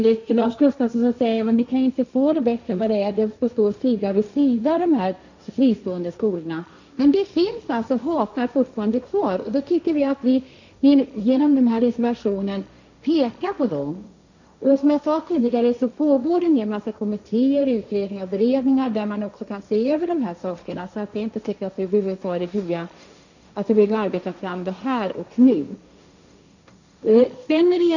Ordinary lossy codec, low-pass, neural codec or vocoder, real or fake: none; 7.2 kHz; codec, 16 kHz, 1.1 kbps, Voila-Tokenizer; fake